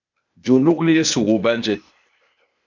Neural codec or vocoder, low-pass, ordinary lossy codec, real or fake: codec, 16 kHz, 0.8 kbps, ZipCodec; 7.2 kHz; MP3, 64 kbps; fake